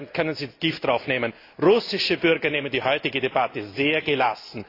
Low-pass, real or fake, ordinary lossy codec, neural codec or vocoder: 5.4 kHz; real; AAC, 32 kbps; none